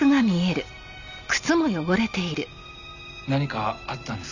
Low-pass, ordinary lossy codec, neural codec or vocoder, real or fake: 7.2 kHz; none; none; real